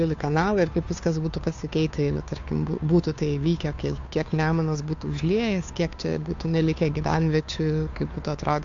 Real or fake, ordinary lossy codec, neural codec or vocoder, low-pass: fake; AAC, 64 kbps; codec, 16 kHz, 2 kbps, FunCodec, trained on Chinese and English, 25 frames a second; 7.2 kHz